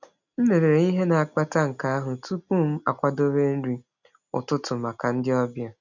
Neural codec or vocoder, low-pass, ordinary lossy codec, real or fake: none; 7.2 kHz; none; real